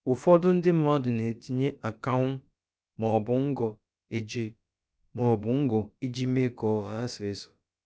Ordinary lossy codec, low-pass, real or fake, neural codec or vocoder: none; none; fake; codec, 16 kHz, about 1 kbps, DyCAST, with the encoder's durations